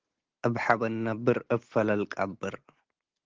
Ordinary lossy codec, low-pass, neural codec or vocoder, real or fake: Opus, 16 kbps; 7.2 kHz; none; real